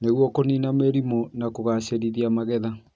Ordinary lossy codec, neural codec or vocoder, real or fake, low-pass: none; none; real; none